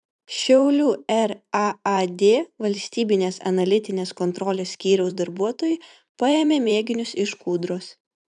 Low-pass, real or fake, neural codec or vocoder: 10.8 kHz; fake; vocoder, 44.1 kHz, 128 mel bands every 512 samples, BigVGAN v2